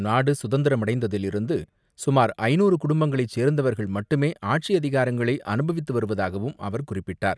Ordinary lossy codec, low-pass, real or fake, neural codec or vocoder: none; none; real; none